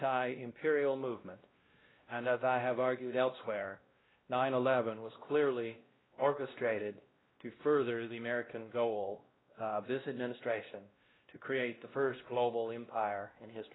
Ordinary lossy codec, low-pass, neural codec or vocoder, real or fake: AAC, 16 kbps; 7.2 kHz; codec, 16 kHz, 1 kbps, X-Codec, WavLM features, trained on Multilingual LibriSpeech; fake